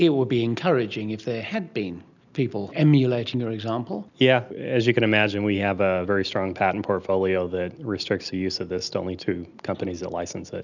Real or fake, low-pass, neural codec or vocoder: real; 7.2 kHz; none